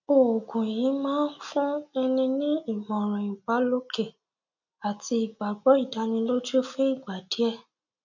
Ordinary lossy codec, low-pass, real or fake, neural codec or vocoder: none; 7.2 kHz; real; none